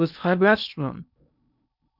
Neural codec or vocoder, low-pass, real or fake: codec, 16 kHz in and 24 kHz out, 0.8 kbps, FocalCodec, streaming, 65536 codes; 5.4 kHz; fake